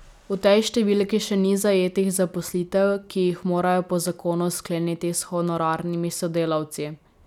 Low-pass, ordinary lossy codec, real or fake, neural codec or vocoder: 19.8 kHz; none; real; none